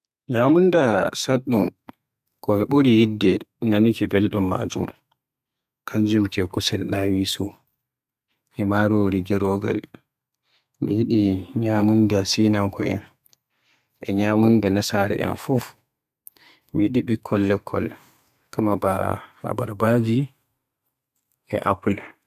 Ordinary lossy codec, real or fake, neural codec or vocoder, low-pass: none; fake; codec, 32 kHz, 1.9 kbps, SNAC; 14.4 kHz